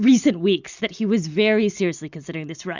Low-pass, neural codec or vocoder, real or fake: 7.2 kHz; none; real